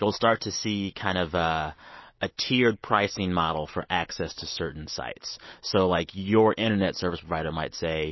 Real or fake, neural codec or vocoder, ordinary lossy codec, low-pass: real; none; MP3, 24 kbps; 7.2 kHz